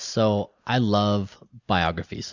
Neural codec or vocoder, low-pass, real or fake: none; 7.2 kHz; real